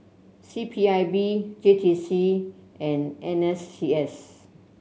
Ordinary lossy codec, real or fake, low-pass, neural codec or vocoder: none; real; none; none